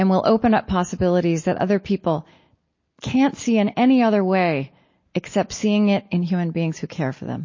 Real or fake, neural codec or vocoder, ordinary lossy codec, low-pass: real; none; MP3, 32 kbps; 7.2 kHz